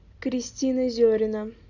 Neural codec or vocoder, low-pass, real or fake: none; 7.2 kHz; real